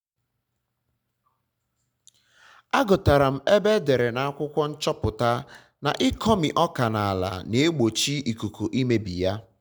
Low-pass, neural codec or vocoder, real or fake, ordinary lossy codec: none; none; real; none